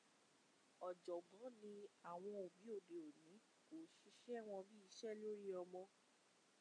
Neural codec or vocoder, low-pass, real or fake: none; 9.9 kHz; real